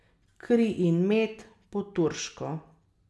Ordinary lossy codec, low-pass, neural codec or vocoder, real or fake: none; none; none; real